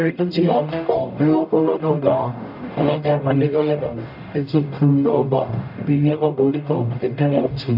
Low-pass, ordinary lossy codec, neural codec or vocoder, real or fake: 5.4 kHz; none; codec, 44.1 kHz, 0.9 kbps, DAC; fake